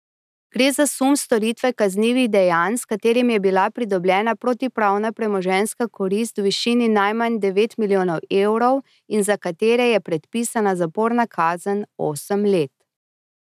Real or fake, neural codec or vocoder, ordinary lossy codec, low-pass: real; none; none; 14.4 kHz